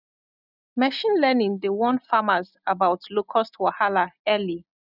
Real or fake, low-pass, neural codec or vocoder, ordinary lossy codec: real; 5.4 kHz; none; none